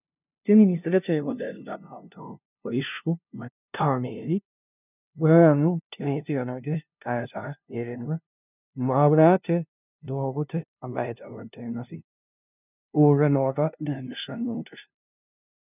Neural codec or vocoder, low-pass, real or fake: codec, 16 kHz, 0.5 kbps, FunCodec, trained on LibriTTS, 25 frames a second; 3.6 kHz; fake